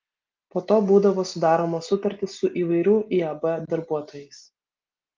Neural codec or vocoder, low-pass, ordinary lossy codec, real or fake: none; 7.2 kHz; Opus, 24 kbps; real